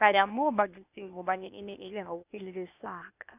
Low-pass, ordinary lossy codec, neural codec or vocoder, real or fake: 3.6 kHz; none; codec, 16 kHz, 0.8 kbps, ZipCodec; fake